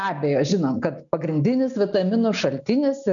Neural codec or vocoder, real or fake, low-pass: none; real; 7.2 kHz